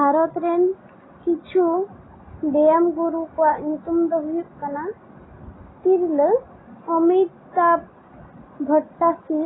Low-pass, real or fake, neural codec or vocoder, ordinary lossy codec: 7.2 kHz; real; none; AAC, 16 kbps